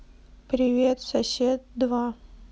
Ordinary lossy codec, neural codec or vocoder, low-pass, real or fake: none; none; none; real